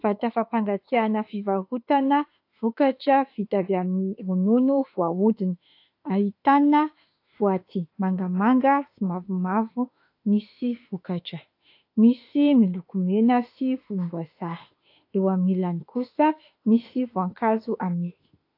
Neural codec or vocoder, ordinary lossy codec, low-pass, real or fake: autoencoder, 48 kHz, 32 numbers a frame, DAC-VAE, trained on Japanese speech; AAC, 32 kbps; 5.4 kHz; fake